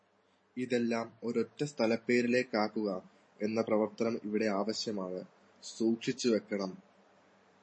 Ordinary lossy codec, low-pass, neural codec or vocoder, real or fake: MP3, 32 kbps; 9.9 kHz; vocoder, 44.1 kHz, 128 mel bands every 512 samples, BigVGAN v2; fake